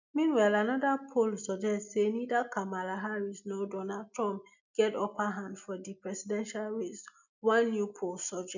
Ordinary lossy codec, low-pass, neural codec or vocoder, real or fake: none; 7.2 kHz; none; real